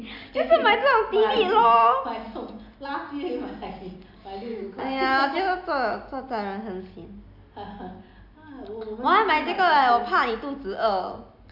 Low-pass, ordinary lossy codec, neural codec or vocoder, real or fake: 5.4 kHz; none; none; real